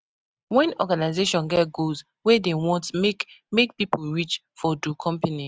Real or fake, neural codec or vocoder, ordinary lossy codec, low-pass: real; none; none; none